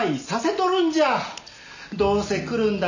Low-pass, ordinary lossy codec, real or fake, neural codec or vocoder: 7.2 kHz; none; real; none